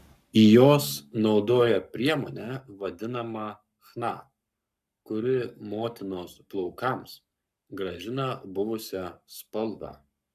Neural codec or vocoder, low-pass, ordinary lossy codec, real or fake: codec, 44.1 kHz, 7.8 kbps, Pupu-Codec; 14.4 kHz; MP3, 96 kbps; fake